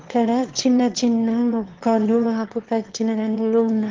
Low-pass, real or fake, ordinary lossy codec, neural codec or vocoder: 7.2 kHz; fake; Opus, 16 kbps; autoencoder, 22.05 kHz, a latent of 192 numbers a frame, VITS, trained on one speaker